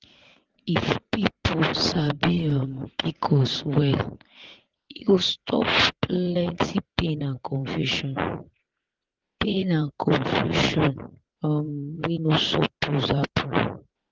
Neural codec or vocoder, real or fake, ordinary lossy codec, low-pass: vocoder, 44.1 kHz, 80 mel bands, Vocos; fake; Opus, 16 kbps; 7.2 kHz